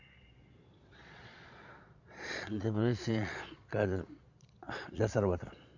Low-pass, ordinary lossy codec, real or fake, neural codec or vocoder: 7.2 kHz; none; fake; vocoder, 22.05 kHz, 80 mel bands, Vocos